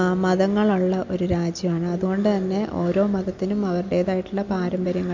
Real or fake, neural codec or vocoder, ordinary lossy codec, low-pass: real; none; MP3, 48 kbps; 7.2 kHz